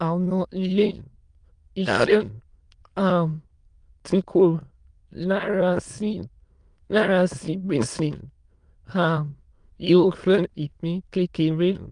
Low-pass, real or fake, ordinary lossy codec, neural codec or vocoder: 9.9 kHz; fake; Opus, 32 kbps; autoencoder, 22.05 kHz, a latent of 192 numbers a frame, VITS, trained on many speakers